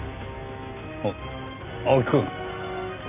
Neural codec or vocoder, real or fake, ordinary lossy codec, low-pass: none; real; AAC, 32 kbps; 3.6 kHz